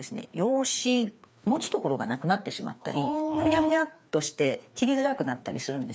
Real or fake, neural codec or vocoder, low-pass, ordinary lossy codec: fake; codec, 16 kHz, 2 kbps, FreqCodec, larger model; none; none